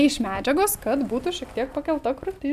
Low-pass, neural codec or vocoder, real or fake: 14.4 kHz; none; real